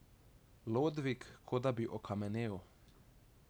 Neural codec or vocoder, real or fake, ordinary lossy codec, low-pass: none; real; none; none